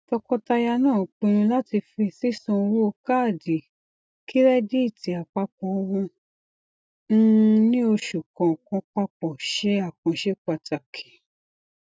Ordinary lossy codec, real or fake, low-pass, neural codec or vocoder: none; real; none; none